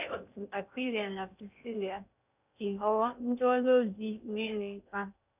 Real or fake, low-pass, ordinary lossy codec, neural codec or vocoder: fake; 3.6 kHz; none; codec, 16 kHz in and 24 kHz out, 0.6 kbps, FocalCodec, streaming, 2048 codes